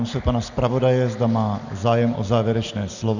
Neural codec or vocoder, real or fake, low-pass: none; real; 7.2 kHz